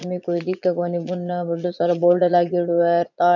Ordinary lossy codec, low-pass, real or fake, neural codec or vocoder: none; 7.2 kHz; fake; vocoder, 22.05 kHz, 80 mel bands, Vocos